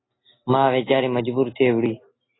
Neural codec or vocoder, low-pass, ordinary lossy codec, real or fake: none; 7.2 kHz; AAC, 16 kbps; real